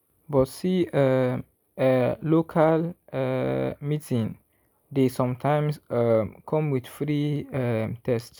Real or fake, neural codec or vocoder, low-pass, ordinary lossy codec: real; none; none; none